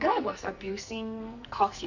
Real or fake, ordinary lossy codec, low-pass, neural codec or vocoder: fake; none; 7.2 kHz; codec, 44.1 kHz, 2.6 kbps, SNAC